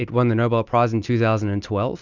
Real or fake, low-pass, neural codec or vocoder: real; 7.2 kHz; none